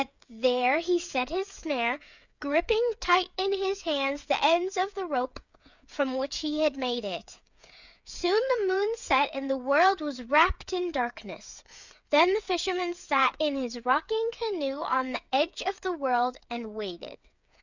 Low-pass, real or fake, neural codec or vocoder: 7.2 kHz; fake; codec, 16 kHz, 8 kbps, FreqCodec, smaller model